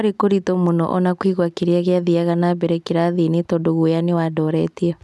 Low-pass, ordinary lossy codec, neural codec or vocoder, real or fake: none; none; none; real